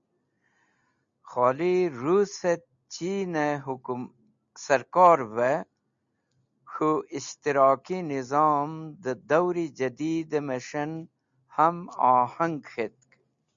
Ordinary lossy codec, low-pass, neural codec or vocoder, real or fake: MP3, 64 kbps; 7.2 kHz; none; real